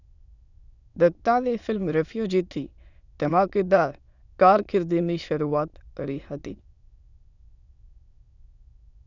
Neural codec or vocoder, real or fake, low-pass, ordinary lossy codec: autoencoder, 22.05 kHz, a latent of 192 numbers a frame, VITS, trained on many speakers; fake; 7.2 kHz; none